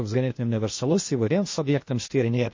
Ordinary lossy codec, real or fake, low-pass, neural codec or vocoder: MP3, 32 kbps; fake; 7.2 kHz; codec, 16 kHz, 0.8 kbps, ZipCodec